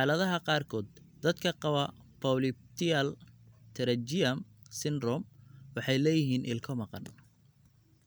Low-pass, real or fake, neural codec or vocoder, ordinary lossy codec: none; real; none; none